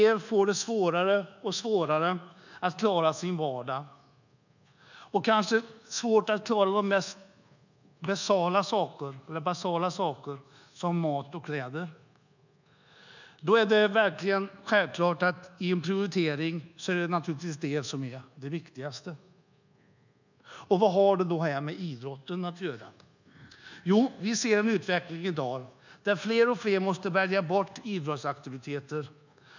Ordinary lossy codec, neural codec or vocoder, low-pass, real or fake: none; codec, 24 kHz, 1.2 kbps, DualCodec; 7.2 kHz; fake